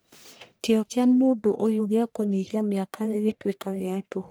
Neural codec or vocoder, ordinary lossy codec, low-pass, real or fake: codec, 44.1 kHz, 1.7 kbps, Pupu-Codec; none; none; fake